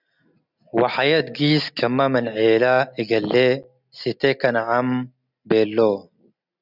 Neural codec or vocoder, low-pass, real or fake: none; 5.4 kHz; real